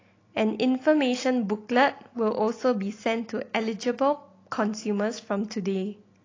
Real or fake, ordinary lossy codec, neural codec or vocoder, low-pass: real; AAC, 32 kbps; none; 7.2 kHz